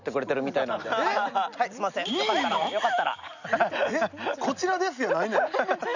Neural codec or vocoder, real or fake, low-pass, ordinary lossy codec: none; real; 7.2 kHz; none